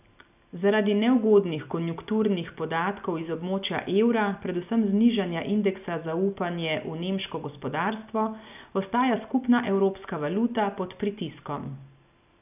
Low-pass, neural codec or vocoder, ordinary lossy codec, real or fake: 3.6 kHz; none; none; real